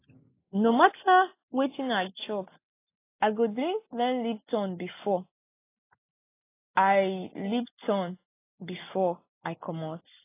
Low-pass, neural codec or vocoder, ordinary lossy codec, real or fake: 3.6 kHz; none; AAC, 24 kbps; real